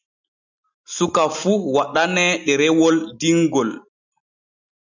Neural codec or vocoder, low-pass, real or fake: none; 7.2 kHz; real